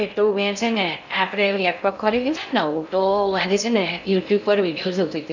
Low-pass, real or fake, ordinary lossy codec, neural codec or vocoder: 7.2 kHz; fake; none; codec, 16 kHz in and 24 kHz out, 0.6 kbps, FocalCodec, streaming, 2048 codes